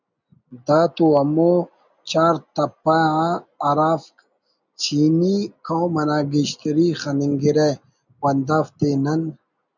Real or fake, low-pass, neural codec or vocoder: real; 7.2 kHz; none